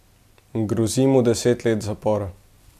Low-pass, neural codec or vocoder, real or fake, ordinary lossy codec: 14.4 kHz; none; real; AAC, 96 kbps